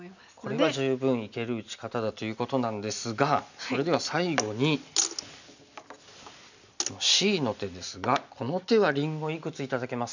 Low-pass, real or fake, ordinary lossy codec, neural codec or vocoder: 7.2 kHz; real; none; none